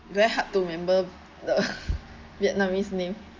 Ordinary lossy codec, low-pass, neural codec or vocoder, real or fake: Opus, 32 kbps; 7.2 kHz; none; real